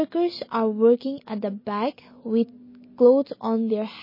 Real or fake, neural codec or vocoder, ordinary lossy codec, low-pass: real; none; MP3, 24 kbps; 5.4 kHz